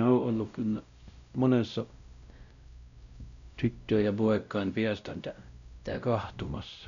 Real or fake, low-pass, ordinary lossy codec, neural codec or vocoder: fake; 7.2 kHz; none; codec, 16 kHz, 0.5 kbps, X-Codec, WavLM features, trained on Multilingual LibriSpeech